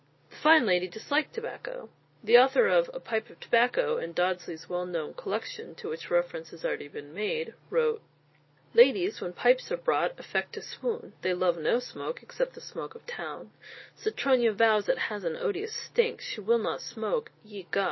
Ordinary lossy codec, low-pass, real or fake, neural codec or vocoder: MP3, 24 kbps; 7.2 kHz; real; none